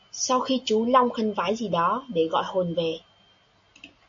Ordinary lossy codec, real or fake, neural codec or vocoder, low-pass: AAC, 64 kbps; real; none; 7.2 kHz